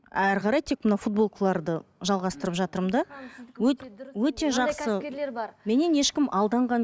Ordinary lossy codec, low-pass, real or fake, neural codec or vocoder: none; none; real; none